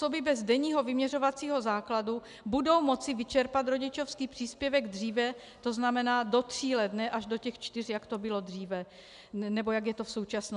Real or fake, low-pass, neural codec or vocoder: real; 10.8 kHz; none